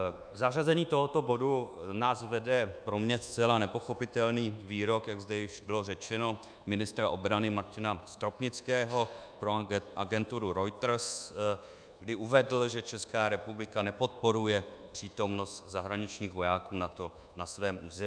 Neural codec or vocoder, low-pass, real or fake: codec, 24 kHz, 1.2 kbps, DualCodec; 9.9 kHz; fake